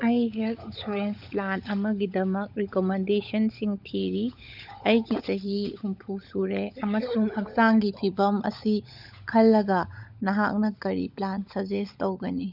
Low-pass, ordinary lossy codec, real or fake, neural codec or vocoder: 5.4 kHz; none; fake; codec, 16 kHz, 16 kbps, FunCodec, trained on LibriTTS, 50 frames a second